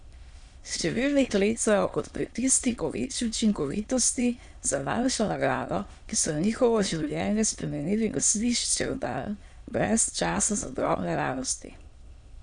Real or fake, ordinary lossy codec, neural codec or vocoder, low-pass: fake; none; autoencoder, 22.05 kHz, a latent of 192 numbers a frame, VITS, trained on many speakers; 9.9 kHz